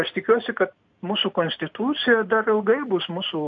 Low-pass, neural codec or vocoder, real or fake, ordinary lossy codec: 7.2 kHz; none; real; MP3, 48 kbps